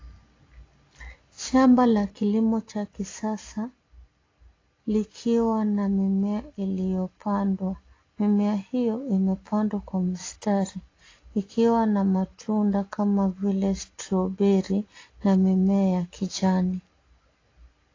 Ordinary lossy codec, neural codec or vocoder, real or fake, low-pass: AAC, 32 kbps; none; real; 7.2 kHz